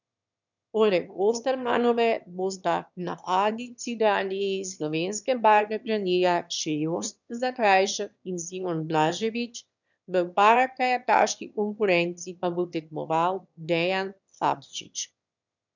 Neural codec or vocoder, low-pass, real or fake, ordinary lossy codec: autoencoder, 22.05 kHz, a latent of 192 numbers a frame, VITS, trained on one speaker; 7.2 kHz; fake; none